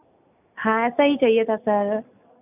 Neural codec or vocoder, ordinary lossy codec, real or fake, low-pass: none; none; real; 3.6 kHz